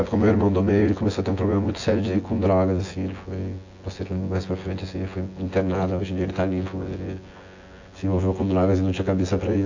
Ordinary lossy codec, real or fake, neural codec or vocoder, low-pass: AAC, 48 kbps; fake; vocoder, 24 kHz, 100 mel bands, Vocos; 7.2 kHz